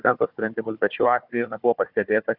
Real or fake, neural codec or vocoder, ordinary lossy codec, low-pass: fake; codec, 16 kHz, 4 kbps, FunCodec, trained on Chinese and English, 50 frames a second; Opus, 64 kbps; 5.4 kHz